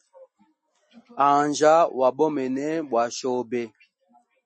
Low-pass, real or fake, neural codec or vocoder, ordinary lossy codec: 10.8 kHz; real; none; MP3, 32 kbps